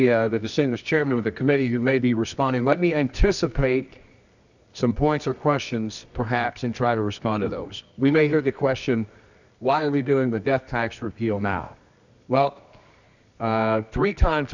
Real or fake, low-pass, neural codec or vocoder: fake; 7.2 kHz; codec, 24 kHz, 0.9 kbps, WavTokenizer, medium music audio release